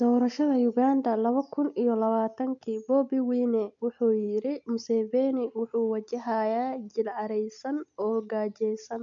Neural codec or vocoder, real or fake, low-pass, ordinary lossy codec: none; real; 7.2 kHz; none